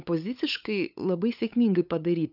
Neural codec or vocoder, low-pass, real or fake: codec, 16 kHz, 8 kbps, FunCodec, trained on LibriTTS, 25 frames a second; 5.4 kHz; fake